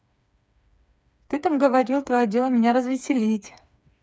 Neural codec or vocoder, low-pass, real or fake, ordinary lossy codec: codec, 16 kHz, 4 kbps, FreqCodec, smaller model; none; fake; none